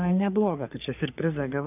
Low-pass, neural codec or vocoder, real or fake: 3.6 kHz; codec, 44.1 kHz, 3.4 kbps, Pupu-Codec; fake